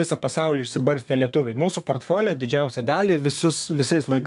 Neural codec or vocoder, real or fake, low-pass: codec, 24 kHz, 1 kbps, SNAC; fake; 10.8 kHz